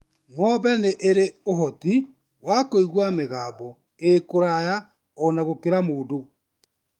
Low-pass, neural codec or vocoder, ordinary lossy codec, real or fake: 19.8 kHz; autoencoder, 48 kHz, 128 numbers a frame, DAC-VAE, trained on Japanese speech; Opus, 32 kbps; fake